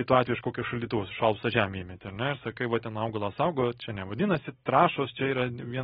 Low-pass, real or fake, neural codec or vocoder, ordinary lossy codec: 19.8 kHz; real; none; AAC, 16 kbps